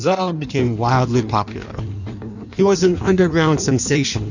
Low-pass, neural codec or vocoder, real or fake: 7.2 kHz; codec, 16 kHz in and 24 kHz out, 1.1 kbps, FireRedTTS-2 codec; fake